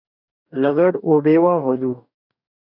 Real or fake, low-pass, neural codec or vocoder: fake; 5.4 kHz; codec, 44.1 kHz, 2.6 kbps, DAC